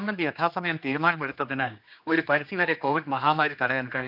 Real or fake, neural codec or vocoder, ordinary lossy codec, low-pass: fake; codec, 16 kHz, 2 kbps, X-Codec, HuBERT features, trained on general audio; none; 5.4 kHz